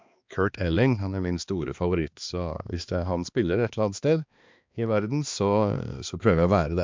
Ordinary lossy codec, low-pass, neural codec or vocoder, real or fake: MP3, 64 kbps; 7.2 kHz; codec, 16 kHz, 2 kbps, X-Codec, HuBERT features, trained on balanced general audio; fake